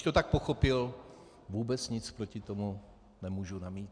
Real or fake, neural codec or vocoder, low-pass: real; none; 9.9 kHz